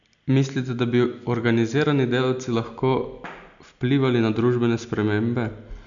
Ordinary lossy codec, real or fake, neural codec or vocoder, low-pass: MP3, 96 kbps; real; none; 7.2 kHz